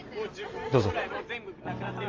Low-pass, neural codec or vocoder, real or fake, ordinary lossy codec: 7.2 kHz; none; real; Opus, 32 kbps